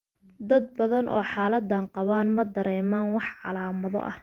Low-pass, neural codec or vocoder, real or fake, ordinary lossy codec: 19.8 kHz; vocoder, 48 kHz, 128 mel bands, Vocos; fake; Opus, 32 kbps